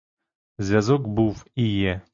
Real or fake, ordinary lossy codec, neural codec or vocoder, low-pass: real; MP3, 48 kbps; none; 7.2 kHz